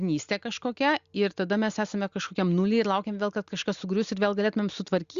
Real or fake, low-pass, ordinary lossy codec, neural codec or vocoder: real; 7.2 kHz; Opus, 64 kbps; none